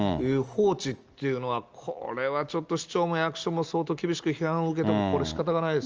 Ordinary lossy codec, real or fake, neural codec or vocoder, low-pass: Opus, 24 kbps; real; none; 7.2 kHz